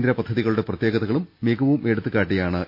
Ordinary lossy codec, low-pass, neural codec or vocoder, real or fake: MP3, 32 kbps; 5.4 kHz; none; real